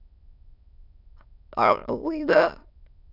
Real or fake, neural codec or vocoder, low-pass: fake; autoencoder, 22.05 kHz, a latent of 192 numbers a frame, VITS, trained on many speakers; 5.4 kHz